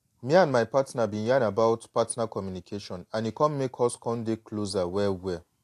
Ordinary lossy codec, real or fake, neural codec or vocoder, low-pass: AAC, 64 kbps; real; none; 14.4 kHz